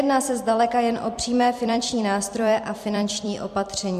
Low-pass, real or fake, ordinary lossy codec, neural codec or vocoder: 14.4 kHz; fake; MP3, 64 kbps; vocoder, 44.1 kHz, 128 mel bands every 256 samples, BigVGAN v2